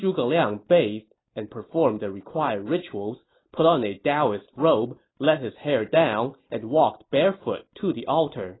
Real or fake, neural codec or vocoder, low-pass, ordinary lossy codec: real; none; 7.2 kHz; AAC, 16 kbps